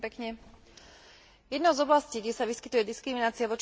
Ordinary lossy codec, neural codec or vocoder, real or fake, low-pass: none; none; real; none